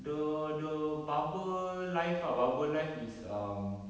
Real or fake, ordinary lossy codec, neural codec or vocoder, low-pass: real; none; none; none